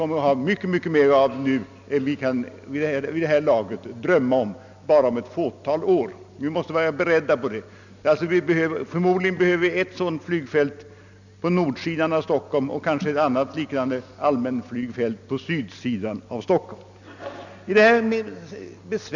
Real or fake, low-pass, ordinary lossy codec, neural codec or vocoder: real; 7.2 kHz; none; none